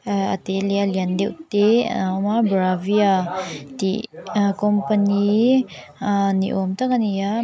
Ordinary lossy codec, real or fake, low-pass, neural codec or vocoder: none; real; none; none